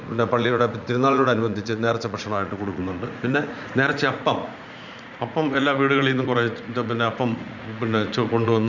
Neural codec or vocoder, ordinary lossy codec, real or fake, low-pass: vocoder, 44.1 kHz, 80 mel bands, Vocos; Opus, 64 kbps; fake; 7.2 kHz